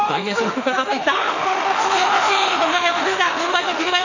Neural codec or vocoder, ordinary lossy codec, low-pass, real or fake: autoencoder, 48 kHz, 32 numbers a frame, DAC-VAE, trained on Japanese speech; none; 7.2 kHz; fake